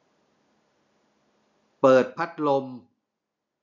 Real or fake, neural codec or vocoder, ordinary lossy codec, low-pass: real; none; none; 7.2 kHz